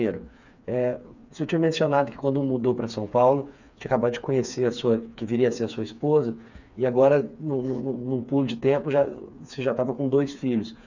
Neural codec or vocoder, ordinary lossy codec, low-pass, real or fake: codec, 16 kHz, 4 kbps, FreqCodec, smaller model; none; 7.2 kHz; fake